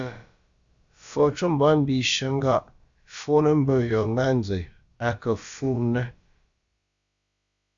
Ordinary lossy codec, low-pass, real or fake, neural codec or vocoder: Opus, 64 kbps; 7.2 kHz; fake; codec, 16 kHz, about 1 kbps, DyCAST, with the encoder's durations